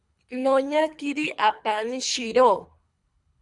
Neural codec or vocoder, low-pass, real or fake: codec, 24 kHz, 3 kbps, HILCodec; 10.8 kHz; fake